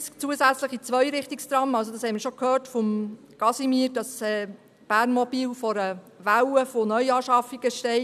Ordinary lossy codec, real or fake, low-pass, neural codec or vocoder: none; real; 14.4 kHz; none